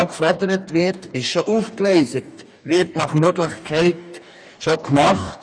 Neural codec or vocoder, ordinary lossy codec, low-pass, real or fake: codec, 44.1 kHz, 2.6 kbps, DAC; none; 9.9 kHz; fake